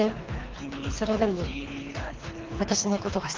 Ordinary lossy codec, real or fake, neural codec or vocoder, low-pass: Opus, 24 kbps; fake; codec, 24 kHz, 3 kbps, HILCodec; 7.2 kHz